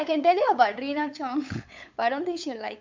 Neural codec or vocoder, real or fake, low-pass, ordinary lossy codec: codec, 16 kHz, 8 kbps, FunCodec, trained on LibriTTS, 25 frames a second; fake; 7.2 kHz; MP3, 64 kbps